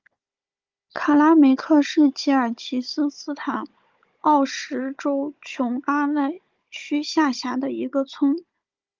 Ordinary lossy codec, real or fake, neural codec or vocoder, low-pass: Opus, 24 kbps; fake; codec, 16 kHz, 16 kbps, FunCodec, trained on Chinese and English, 50 frames a second; 7.2 kHz